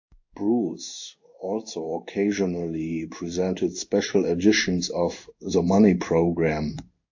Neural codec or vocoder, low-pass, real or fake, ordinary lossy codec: codec, 16 kHz in and 24 kHz out, 1 kbps, XY-Tokenizer; 7.2 kHz; fake; AAC, 48 kbps